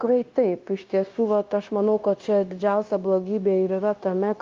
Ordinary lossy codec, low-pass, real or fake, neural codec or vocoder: Opus, 32 kbps; 7.2 kHz; fake; codec, 16 kHz, 0.9 kbps, LongCat-Audio-Codec